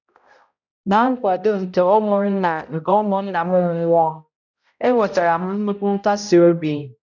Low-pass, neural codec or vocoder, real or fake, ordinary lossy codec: 7.2 kHz; codec, 16 kHz, 0.5 kbps, X-Codec, HuBERT features, trained on balanced general audio; fake; none